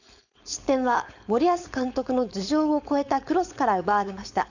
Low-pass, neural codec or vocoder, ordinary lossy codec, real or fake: 7.2 kHz; codec, 16 kHz, 4.8 kbps, FACodec; none; fake